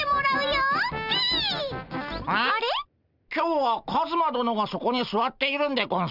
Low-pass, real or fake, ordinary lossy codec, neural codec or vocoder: 5.4 kHz; real; none; none